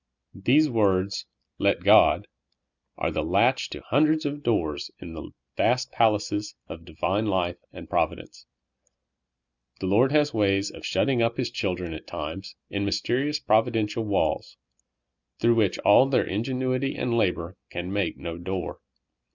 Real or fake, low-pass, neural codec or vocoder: real; 7.2 kHz; none